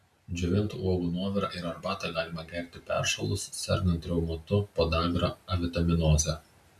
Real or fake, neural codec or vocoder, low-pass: real; none; 14.4 kHz